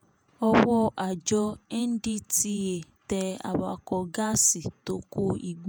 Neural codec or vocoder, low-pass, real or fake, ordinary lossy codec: vocoder, 48 kHz, 128 mel bands, Vocos; none; fake; none